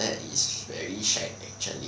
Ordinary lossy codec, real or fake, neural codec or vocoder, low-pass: none; real; none; none